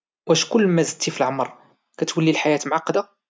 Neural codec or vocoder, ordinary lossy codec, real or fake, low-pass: none; none; real; none